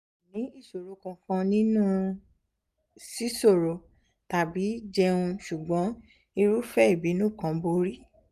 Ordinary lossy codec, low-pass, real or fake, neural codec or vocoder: none; 14.4 kHz; real; none